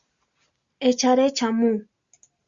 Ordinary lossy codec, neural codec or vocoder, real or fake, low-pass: Opus, 64 kbps; none; real; 7.2 kHz